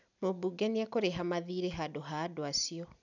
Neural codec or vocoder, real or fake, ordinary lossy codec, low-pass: none; real; none; 7.2 kHz